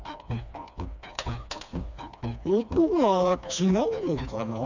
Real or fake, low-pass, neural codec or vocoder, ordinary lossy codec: fake; 7.2 kHz; codec, 16 kHz, 2 kbps, FreqCodec, smaller model; none